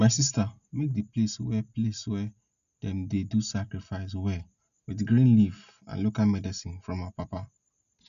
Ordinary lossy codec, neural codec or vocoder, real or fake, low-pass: none; none; real; 7.2 kHz